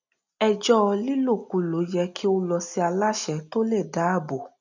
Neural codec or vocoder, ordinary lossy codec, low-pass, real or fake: none; none; 7.2 kHz; real